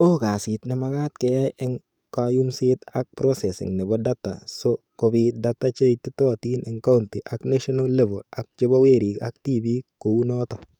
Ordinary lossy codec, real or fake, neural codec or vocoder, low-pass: none; fake; codec, 44.1 kHz, 7.8 kbps, DAC; 19.8 kHz